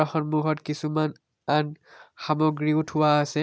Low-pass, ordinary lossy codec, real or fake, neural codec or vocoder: none; none; real; none